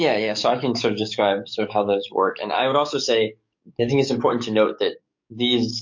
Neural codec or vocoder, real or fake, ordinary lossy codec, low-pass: codec, 44.1 kHz, 7.8 kbps, DAC; fake; MP3, 48 kbps; 7.2 kHz